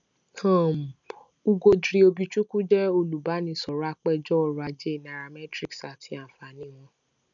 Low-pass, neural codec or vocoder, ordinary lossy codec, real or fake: 7.2 kHz; none; none; real